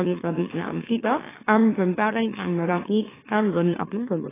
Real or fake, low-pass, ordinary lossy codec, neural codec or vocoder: fake; 3.6 kHz; AAC, 16 kbps; autoencoder, 44.1 kHz, a latent of 192 numbers a frame, MeloTTS